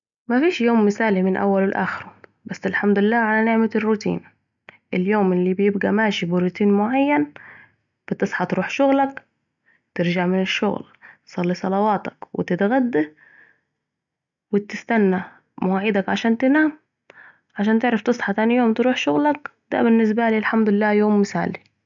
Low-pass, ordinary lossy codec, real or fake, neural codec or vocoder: 7.2 kHz; none; real; none